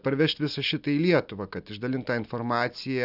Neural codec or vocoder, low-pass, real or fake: none; 5.4 kHz; real